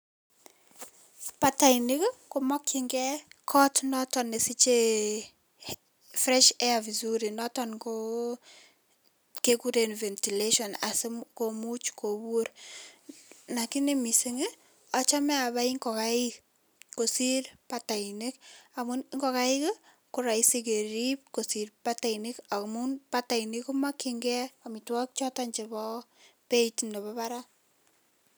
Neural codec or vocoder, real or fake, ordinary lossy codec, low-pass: none; real; none; none